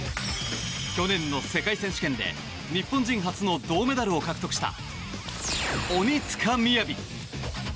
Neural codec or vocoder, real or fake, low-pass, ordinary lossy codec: none; real; none; none